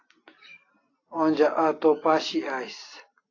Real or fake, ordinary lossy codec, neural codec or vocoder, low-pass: real; AAC, 32 kbps; none; 7.2 kHz